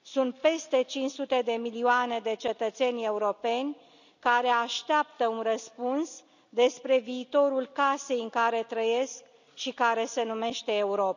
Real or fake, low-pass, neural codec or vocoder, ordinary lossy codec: real; 7.2 kHz; none; none